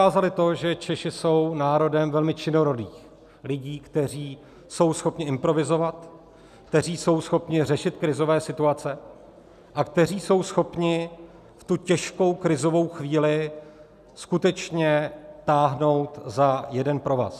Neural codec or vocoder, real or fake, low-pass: none; real; 14.4 kHz